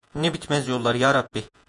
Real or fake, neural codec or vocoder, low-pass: fake; vocoder, 48 kHz, 128 mel bands, Vocos; 10.8 kHz